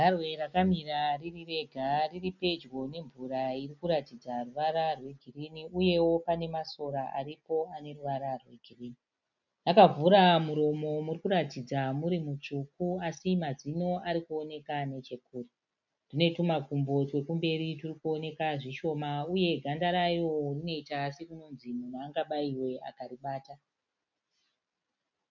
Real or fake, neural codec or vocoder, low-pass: real; none; 7.2 kHz